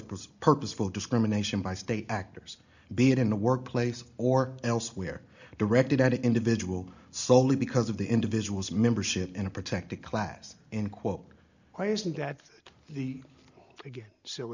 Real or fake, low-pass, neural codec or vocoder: real; 7.2 kHz; none